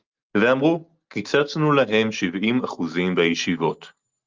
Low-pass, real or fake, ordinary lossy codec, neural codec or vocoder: 7.2 kHz; real; Opus, 24 kbps; none